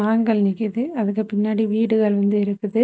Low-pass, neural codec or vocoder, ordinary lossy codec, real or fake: none; none; none; real